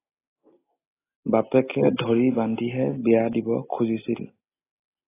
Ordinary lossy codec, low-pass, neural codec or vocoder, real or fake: AAC, 16 kbps; 3.6 kHz; none; real